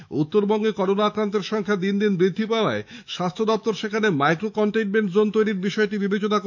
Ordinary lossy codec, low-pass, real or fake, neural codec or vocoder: none; 7.2 kHz; fake; autoencoder, 48 kHz, 128 numbers a frame, DAC-VAE, trained on Japanese speech